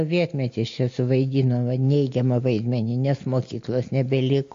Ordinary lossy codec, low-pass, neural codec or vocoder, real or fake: AAC, 48 kbps; 7.2 kHz; none; real